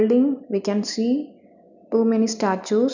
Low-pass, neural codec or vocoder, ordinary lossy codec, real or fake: 7.2 kHz; none; none; real